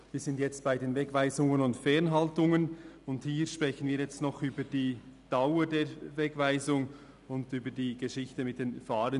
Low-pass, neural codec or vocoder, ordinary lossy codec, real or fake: 10.8 kHz; none; none; real